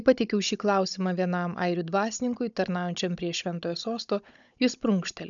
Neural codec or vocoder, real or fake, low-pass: codec, 16 kHz, 16 kbps, FunCodec, trained on Chinese and English, 50 frames a second; fake; 7.2 kHz